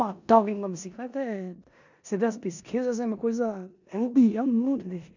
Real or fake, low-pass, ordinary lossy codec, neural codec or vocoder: fake; 7.2 kHz; none; codec, 16 kHz in and 24 kHz out, 0.9 kbps, LongCat-Audio-Codec, four codebook decoder